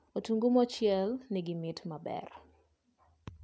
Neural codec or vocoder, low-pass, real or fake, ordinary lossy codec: none; none; real; none